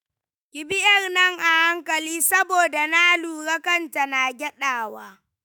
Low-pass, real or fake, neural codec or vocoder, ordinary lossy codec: none; fake; autoencoder, 48 kHz, 128 numbers a frame, DAC-VAE, trained on Japanese speech; none